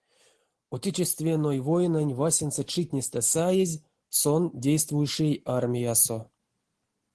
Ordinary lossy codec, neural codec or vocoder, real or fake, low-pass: Opus, 16 kbps; none; real; 10.8 kHz